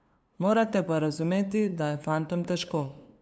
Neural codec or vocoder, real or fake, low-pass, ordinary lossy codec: codec, 16 kHz, 2 kbps, FunCodec, trained on LibriTTS, 25 frames a second; fake; none; none